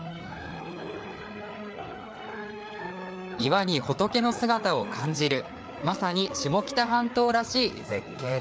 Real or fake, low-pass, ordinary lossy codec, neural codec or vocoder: fake; none; none; codec, 16 kHz, 4 kbps, FreqCodec, larger model